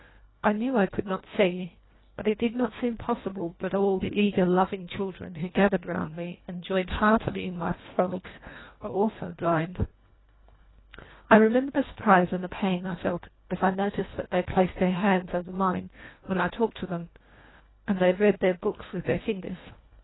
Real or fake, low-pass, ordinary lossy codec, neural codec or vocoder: fake; 7.2 kHz; AAC, 16 kbps; codec, 24 kHz, 1.5 kbps, HILCodec